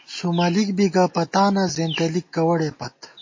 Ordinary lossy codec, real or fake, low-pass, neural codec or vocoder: MP3, 32 kbps; real; 7.2 kHz; none